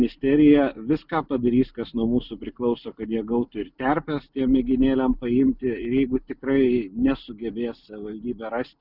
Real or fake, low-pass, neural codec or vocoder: real; 5.4 kHz; none